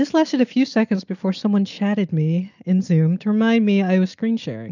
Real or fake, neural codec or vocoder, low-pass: real; none; 7.2 kHz